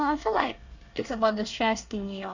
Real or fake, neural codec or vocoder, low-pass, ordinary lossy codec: fake; codec, 24 kHz, 1 kbps, SNAC; 7.2 kHz; none